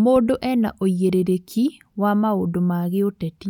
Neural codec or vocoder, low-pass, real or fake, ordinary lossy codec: none; 19.8 kHz; real; none